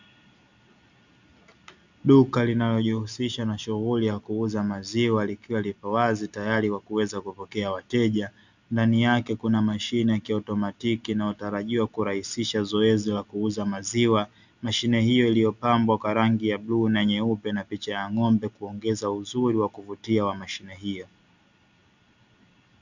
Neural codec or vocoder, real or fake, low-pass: none; real; 7.2 kHz